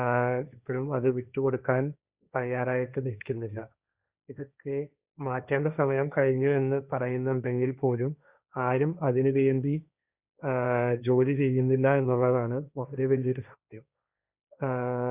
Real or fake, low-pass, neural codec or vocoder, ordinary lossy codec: fake; 3.6 kHz; codec, 16 kHz, 1.1 kbps, Voila-Tokenizer; Opus, 64 kbps